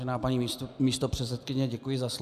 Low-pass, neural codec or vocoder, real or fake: 14.4 kHz; none; real